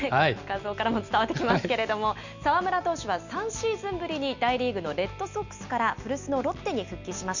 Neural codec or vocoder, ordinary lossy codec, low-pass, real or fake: none; none; 7.2 kHz; real